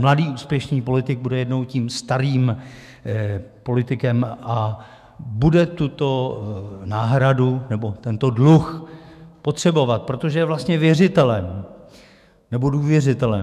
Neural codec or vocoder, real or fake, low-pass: autoencoder, 48 kHz, 128 numbers a frame, DAC-VAE, trained on Japanese speech; fake; 14.4 kHz